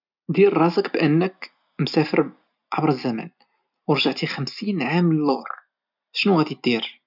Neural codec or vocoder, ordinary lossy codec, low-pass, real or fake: none; none; 5.4 kHz; real